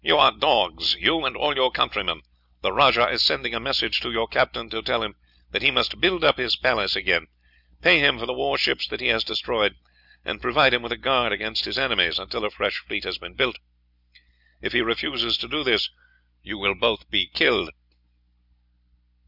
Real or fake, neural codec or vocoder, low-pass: real; none; 5.4 kHz